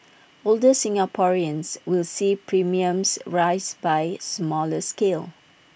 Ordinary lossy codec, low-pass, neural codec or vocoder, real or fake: none; none; none; real